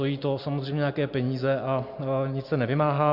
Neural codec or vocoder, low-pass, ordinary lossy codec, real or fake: none; 5.4 kHz; MP3, 48 kbps; real